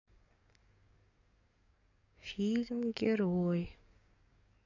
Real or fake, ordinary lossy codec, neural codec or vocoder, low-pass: fake; none; codec, 44.1 kHz, 7.8 kbps, DAC; 7.2 kHz